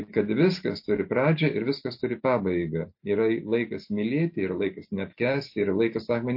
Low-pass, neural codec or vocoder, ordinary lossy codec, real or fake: 5.4 kHz; none; MP3, 32 kbps; real